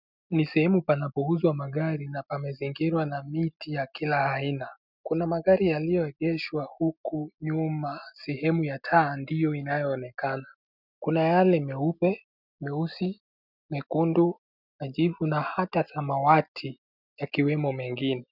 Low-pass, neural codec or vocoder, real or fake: 5.4 kHz; none; real